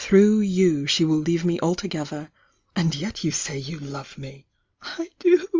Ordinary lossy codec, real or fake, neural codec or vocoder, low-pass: Opus, 32 kbps; real; none; 7.2 kHz